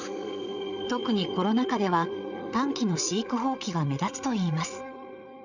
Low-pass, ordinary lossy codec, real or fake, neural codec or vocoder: 7.2 kHz; none; fake; codec, 16 kHz, 8 kbps, FreqCodec, larger model